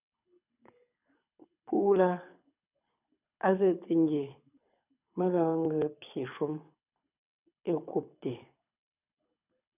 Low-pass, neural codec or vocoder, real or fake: 3.6 kHz; codec, 24 kHz, 6 kbps, HILCodec; fake